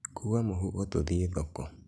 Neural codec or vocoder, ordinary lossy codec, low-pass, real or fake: none; none; none; real